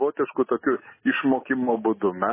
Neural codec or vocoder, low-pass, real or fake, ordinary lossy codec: none; 3.6 kHz; real; MP3, 16 kbps